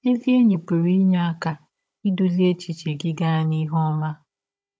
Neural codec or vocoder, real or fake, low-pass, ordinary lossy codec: codec, 16 kHz, 16 kbps, FunCodec, trained on Chinese and English, 50 frames a second; fake; none; none